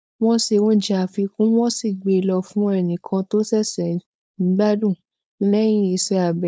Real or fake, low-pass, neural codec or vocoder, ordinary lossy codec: fake; none; codec, 16 kHz, 4.8 kbps, FACodec; none